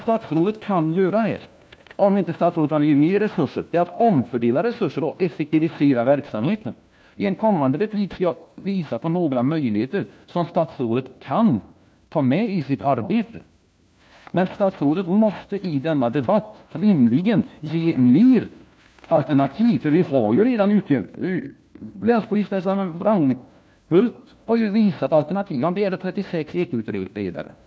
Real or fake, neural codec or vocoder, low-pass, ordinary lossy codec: fake; codec, 16 kHz, 1 kbps, FunCodec, trained on LibriTTS, 50 frames a second; none; none